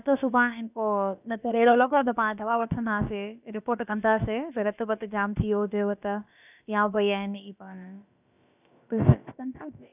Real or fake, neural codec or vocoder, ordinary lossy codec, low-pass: fake; codec, 16 kHz, about 1 kbps, DyCAST, with the encoder's durations; none; 3.6 kHz